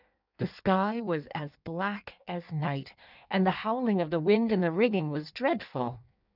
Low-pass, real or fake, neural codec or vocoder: 5.4 kHz; fake; codec, 16 kHz in and 24 kHz out, 1.1 kbps, FireRedTTS-2 codec